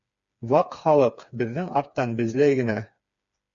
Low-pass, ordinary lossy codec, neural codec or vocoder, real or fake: 7.2 kHz; MP3, 48 kbps; codec, 16 kHz, 4 kbps, FreqCodec, smaller model; fake